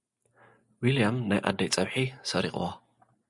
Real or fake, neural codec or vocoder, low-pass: real; none; 10.8 kHz